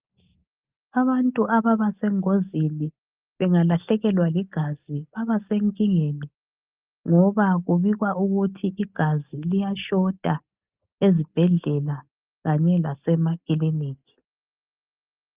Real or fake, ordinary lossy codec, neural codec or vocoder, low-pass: real; Opus, 32 kbps; none; 3.6 kHz